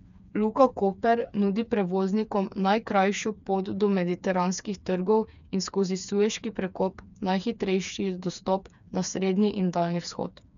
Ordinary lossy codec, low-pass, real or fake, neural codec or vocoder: none; 7.2 kHz; fake; codec, 16 kHz, 4 kbps, FreqCodec, smaller model